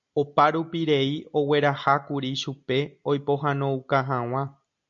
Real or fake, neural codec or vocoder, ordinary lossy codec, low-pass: real; none; AAC, 64 kbps; 7.2 kHz